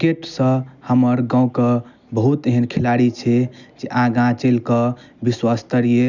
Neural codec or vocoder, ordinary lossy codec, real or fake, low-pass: none; none; real; 7.2 kHz